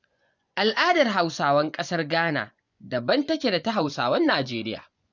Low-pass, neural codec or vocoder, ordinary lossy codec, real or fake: 7.2 kHz; vocoder, 24 kHz, 100 mel bands, Vocos; none; fake